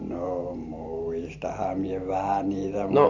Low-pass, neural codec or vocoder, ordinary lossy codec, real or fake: 7.2 kHz; vocoder, 44.1 kHz, 128 mel bands every 512 samples, BigVGAN v2; none; fake